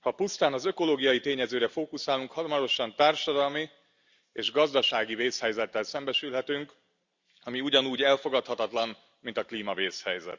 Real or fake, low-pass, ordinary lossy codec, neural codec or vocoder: real; 7.2 kHz; Opus, 64 kbps; none